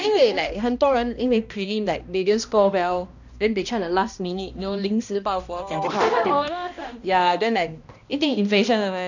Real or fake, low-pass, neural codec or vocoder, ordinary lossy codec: fake; 7.2 kHz; codec, 16 kHz, 1 kbps, X-Codec, HuBERT features, trained on balanced general audio; none